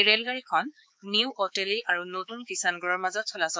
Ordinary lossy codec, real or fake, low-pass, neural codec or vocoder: none; fake; none; codec, 16 kHz, 4 kbps, X-Codec, HuBERT features, trained on balanced general audio